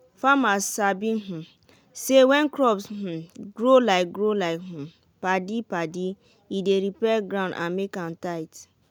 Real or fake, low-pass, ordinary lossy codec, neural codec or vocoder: real; none; none; none